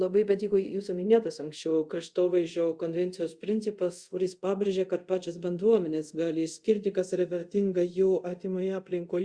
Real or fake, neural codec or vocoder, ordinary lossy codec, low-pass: fake; codec, 24 kHz, 0.5 kbps, DualCodec; Opus, 64 kbps; 9.9 kHz